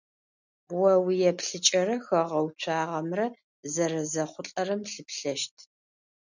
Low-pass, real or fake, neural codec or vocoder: 7.2 kHz; real; none